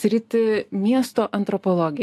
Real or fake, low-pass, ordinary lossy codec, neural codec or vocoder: real; 14.4 kHz; AAC, 64 kbps; none